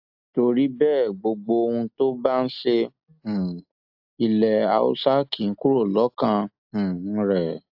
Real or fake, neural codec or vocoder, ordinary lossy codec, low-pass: real; none; AAC, 48 kbps; 5.4 kHz